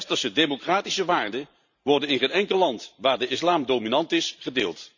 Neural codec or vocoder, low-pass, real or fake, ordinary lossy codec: vocoder, 44.1 kHz, 128 mel bands every 512 samples, BigVGAN v2; 7.2 kHz; fake; none